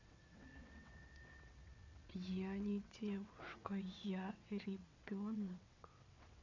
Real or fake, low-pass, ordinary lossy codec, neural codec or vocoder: fake; 7.2 kHz; MP3, 64 kbps; vocoder, 22.05 kHz, 80 mel bands, WaveNeXt